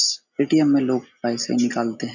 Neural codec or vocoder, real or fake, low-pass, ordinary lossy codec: none; real; 7.2 kHz; none